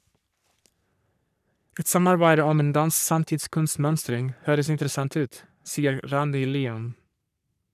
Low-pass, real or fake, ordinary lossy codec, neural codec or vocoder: 14.4 kHz; fake; none; codec, 44.1 kHz, 3.4 kbps, Pupu-Codec